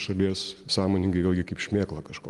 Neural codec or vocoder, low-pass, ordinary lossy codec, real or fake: none; 10.8 kHz; Opus, 24 kbps; real